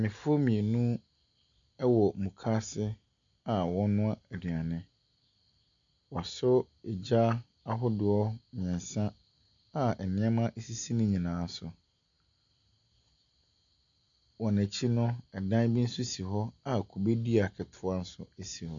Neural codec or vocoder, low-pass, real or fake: none; 7.2 kHz; real